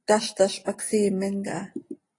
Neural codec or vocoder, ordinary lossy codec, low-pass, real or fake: none; AAC, 32 kbps; 10.8 kHz; real